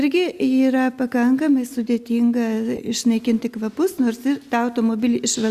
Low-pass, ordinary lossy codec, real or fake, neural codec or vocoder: 14.4 kHz; Opus, 64 kbps; real; none